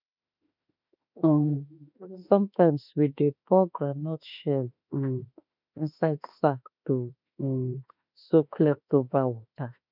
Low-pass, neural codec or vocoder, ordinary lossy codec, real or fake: 5.4 kHz; autoencoder, 48 kHz, 32 numbers a frame, DAC-VAE, trained on Japanese speech; none; fake